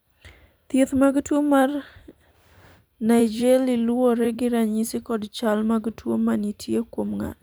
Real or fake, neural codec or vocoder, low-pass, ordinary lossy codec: fake; vocoder, 44.1 kHz, 128 mel bands every 512 samples, BigVGAN v2; none; none